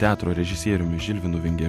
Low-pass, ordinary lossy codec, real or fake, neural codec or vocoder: 14.4 kHz; MP3, 64 kbps; real; none